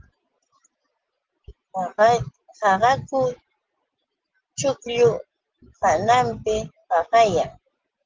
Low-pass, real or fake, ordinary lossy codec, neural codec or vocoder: 7.2 kHz; real; Opus, 16 kbps; none